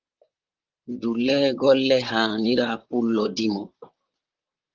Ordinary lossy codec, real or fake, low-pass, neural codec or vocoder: Opus, 32 kbps; fake; 7.2 kHz; vocoder, 44.1 kHz, 128 mel bands, Pupu-Vocoder